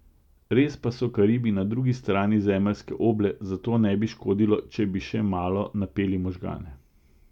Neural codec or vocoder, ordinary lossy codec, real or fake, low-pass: none; none; real; 19.8 kHz